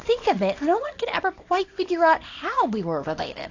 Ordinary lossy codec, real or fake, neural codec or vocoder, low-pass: AAC, 48 kbps; fake; codec, 24 kHz, 0.9 kbps, WavTokenizer, small release; 7.2 kHz